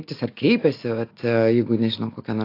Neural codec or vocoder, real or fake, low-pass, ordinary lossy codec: none; real; 5.4 kHz; AAC, 32 kbps